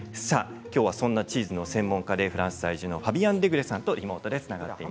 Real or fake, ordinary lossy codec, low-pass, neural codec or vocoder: real; none; none; none